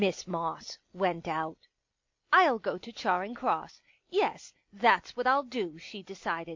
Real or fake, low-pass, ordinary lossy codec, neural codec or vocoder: real; 7.2 kHz; MP3, 48 kbps; none